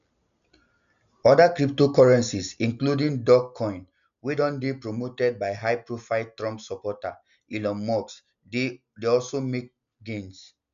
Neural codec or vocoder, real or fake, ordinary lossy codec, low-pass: none; real; none; 7.2 kHz